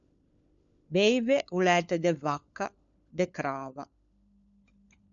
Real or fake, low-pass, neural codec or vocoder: fake; 7.2 kHz; codec, 16 kHz, 4 kbps, FunCodec, trained on LibriTTS, 50 frames a second